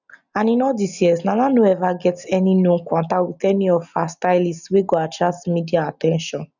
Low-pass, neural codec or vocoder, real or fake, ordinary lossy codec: 7.2 kHz; none; real; none